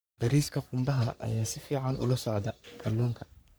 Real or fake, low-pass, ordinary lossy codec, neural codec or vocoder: fake; none; none; codec, 44.1 kHz, 3.4 kbps, Pupu-Codec